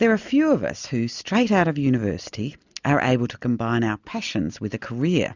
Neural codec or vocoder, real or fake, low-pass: none; real; 7.2 kHz